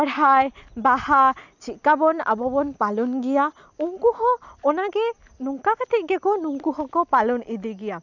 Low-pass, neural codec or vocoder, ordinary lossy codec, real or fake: 7.2 kHz; none; none; real